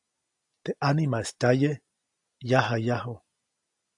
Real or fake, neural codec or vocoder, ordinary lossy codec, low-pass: real; none; AAC, 64 kbps; 10.8 kHz